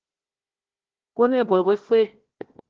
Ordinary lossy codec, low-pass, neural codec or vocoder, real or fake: Opus, 16 kbps; 7.2 kHz; codec, 16 kHz, 1 kbps, FunCodec, trained on Chinese and English, 50 frames a second; fake